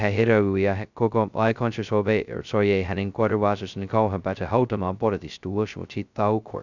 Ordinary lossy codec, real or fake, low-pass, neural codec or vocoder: none; fake; 7.2 kHz; codec, 16 kHz, 0.2 kbps, FocalCodec